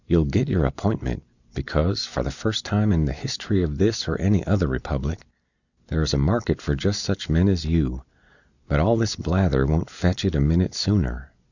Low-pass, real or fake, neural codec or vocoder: 7.2 kHz; real; none